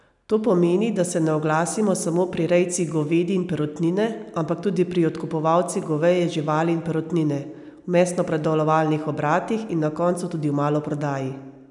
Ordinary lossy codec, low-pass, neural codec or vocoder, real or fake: none; 10.8 kHz; none; real